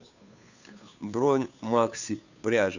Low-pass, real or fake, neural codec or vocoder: 7.2 kHz; fake; codec, 16 kHz, 2 kbps, FunCodec, trained on Chinese and English, 25 frames a second